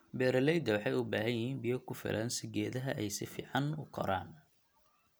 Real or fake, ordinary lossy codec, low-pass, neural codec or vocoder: real; none; none; none